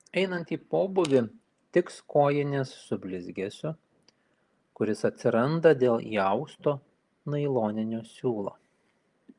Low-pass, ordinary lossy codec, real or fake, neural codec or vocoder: 10.8 kHz; Opus, 32 kbps; real; none